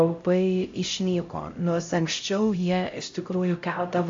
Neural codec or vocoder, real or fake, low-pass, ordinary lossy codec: codec, 16 kHz, 0.5 kbps, X-Codec, HuBERT features, trained on LibriSpeech; fake; 7.2 kHz; AAC, 48 kbps